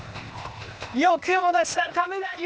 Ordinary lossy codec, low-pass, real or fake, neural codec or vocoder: none; none; fake; codec, 16 kHz, 0.8 kbps, ZipCodec